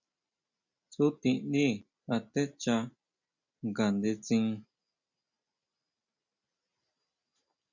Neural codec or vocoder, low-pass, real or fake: none; 7.2 kHz; real